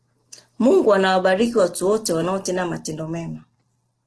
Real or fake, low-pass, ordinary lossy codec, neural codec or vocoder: real; 10.8 kHz; Opus, 16 kbps; none